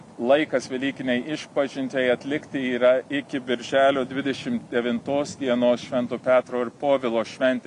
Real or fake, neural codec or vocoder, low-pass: real; none; 10.8 kHz